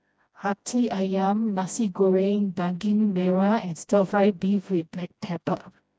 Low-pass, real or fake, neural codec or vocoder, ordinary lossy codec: none; fake; codec, 16 kHz, 1 kbps, FreqCodec, smaller model; none